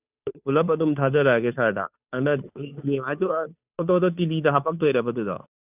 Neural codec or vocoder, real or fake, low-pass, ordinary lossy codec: codec, 16 kHz, 2 kbps, FunCodec, trained on Chinese and English, 25 frames a second; fake; 3.6 kHz; none